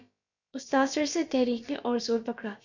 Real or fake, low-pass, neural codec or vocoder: fake; 7.2 kHz; codec, 16 kHz, about 1 kbps, DyCAST, with the encoder's durations